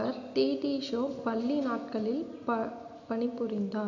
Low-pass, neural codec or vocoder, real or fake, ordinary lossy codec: 7.2 kHz; none; real; AAC, 48 kbps